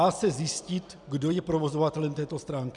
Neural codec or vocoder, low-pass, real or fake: none; 10.8 kHz; real